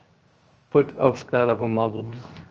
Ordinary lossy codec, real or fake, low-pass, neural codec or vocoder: Opus, 24 kbps; fake; 7.2 kHz; codec, 16 kHz, 0.7 kbps, FocalCodec